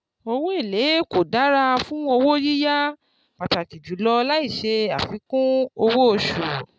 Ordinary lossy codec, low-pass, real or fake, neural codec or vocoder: none; none; real; none